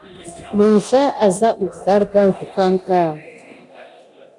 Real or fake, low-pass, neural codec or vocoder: fake; 10.8 kHz; codec, 24 kHz, 0.9 kbps, DualCodec